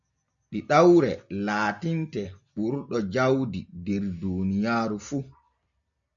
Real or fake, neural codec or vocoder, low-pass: real; none; 7.2 kHz